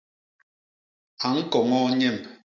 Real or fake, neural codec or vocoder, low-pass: real; none; 7.2 kHz